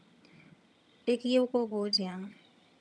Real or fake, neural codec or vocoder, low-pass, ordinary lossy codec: fake; vocoder, 22.05 kHz, 80 mel bands, HiFi-GAN; none; none